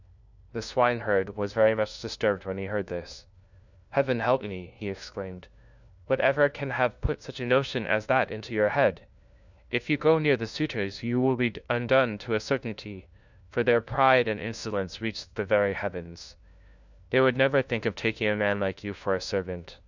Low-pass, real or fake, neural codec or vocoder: 7.2 kHz; fake; codec, 16 kHz, 1 kbps, FunCodec, trained on LibriTTS, 50 frames a second